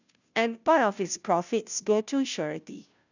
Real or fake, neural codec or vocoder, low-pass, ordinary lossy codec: fake; codec, 16 kHz, 0.5 kbps, FunCodec, trained on Chinese and English, 25 frames a second; 7.2 kHz; none